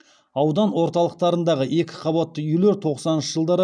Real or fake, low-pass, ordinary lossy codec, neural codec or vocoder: real; 9.9 kHz; none; none